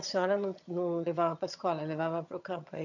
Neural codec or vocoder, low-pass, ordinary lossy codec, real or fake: vocoder, 22.05 kHz, 80 mel bands, HiFi-GAN; 7.2 kHz; none; fake